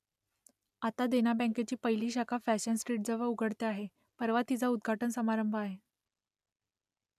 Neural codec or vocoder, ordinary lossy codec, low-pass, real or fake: none; none; 14.4 kHz; real